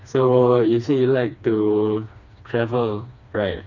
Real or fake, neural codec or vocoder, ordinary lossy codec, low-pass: fake; codec, 16 kHz, 2 kbps, FreqCodec, smaller model; none; 7.2 kHz